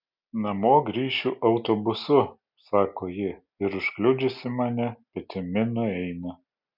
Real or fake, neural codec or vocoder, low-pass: real; none; 5.4 kHz